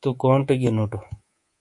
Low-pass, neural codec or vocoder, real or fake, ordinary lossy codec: 10.8 kHz; codec, 24 kHz, 3.1 kbps, DualCodec; fake; MP3, 48 kbps